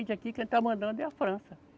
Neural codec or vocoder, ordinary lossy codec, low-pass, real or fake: none; none; none; real